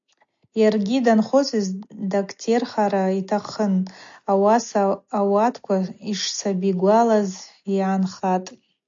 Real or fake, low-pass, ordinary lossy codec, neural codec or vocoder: real; 7.2 kHz; AAC, 64 kbps; none